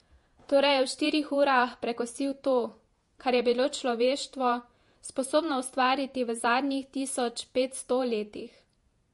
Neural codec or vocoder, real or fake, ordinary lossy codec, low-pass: vocoder, 48 kHz, 128 mel bands, Vocos; fake; MP3, 48 kbps; 14.4 kHz